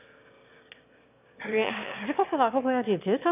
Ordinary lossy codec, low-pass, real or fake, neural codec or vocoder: none; 3.6 kHz; fake; autoencoder, 22.05 kHz, a latent of 192 numbers a frame, VITS, trained on one speaker